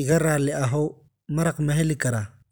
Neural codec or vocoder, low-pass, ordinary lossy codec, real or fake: none; none; none; real